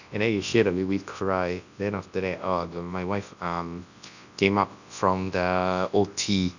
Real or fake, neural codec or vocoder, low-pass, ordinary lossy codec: fake; codec, 24 kHz, 0.9 kbps, WavTokenizer, large speech release; 7.2 kHz; none